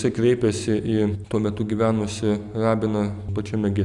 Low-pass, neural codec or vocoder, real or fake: 10.8 kHz; none; real